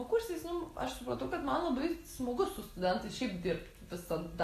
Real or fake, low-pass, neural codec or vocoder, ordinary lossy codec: real; 14.4 kHz; none; AAC, 48 kbps